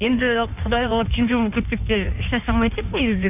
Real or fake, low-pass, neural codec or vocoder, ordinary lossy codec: fake; 3.6 kHz; codec, 16 kHz in and 24 kHz out, 1.1 kbps, FireRedTTS-2 codec; none